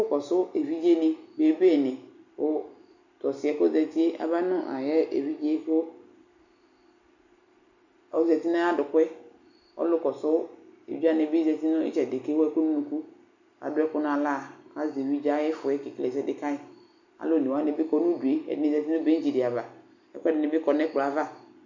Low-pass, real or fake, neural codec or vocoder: 7.2 kHz; real; none